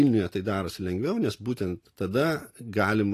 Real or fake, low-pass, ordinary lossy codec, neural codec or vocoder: real; 14.4 kHz; AAC, 48 kbps; none